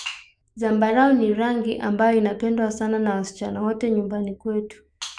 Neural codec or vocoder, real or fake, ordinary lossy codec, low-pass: autoencoder, 48 kHz, 128 numbers a frame, DAC-VAE, trained on Japanese speech; fake; none; 9.9 kHz